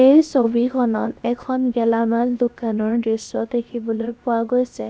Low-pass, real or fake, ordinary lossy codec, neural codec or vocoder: none; fake; none; codec, 16 kHz, about 1 kbps, DyCAST, with the encoder's durations